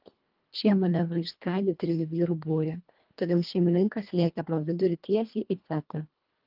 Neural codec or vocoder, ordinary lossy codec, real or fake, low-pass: codec, 24 kHz, 1.5 kbps, HILCodec; Opus, 32 kbps; fake; 5.4 kHz